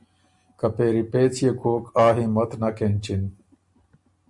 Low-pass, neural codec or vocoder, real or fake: 10.8 kHz; none; real